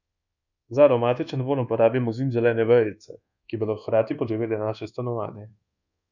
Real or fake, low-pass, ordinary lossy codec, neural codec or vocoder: fake; 7.2 kHz; none; codec, 24 kHz, 1.2 kbps, DualCodec